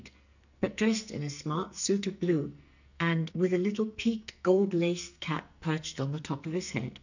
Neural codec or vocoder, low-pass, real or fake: codec, 44.1 kHz, 2.6 kbps, SNAC; 7.2 kHz; fake